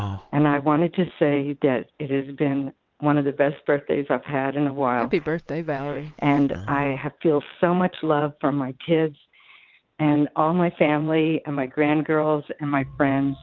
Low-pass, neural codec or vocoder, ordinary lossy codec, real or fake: 7.2 kHz; vocoder, 22.05 kHz, 80 mel bands, WaveNeXt; Opus, 24 kbps; fake